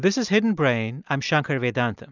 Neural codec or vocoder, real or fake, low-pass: none; real; 7.2 kHz